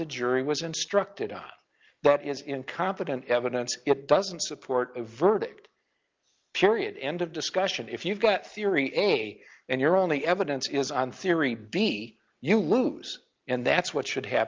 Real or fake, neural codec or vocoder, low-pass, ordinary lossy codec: real; none; 7.2 kHz; Opus, 24 kbps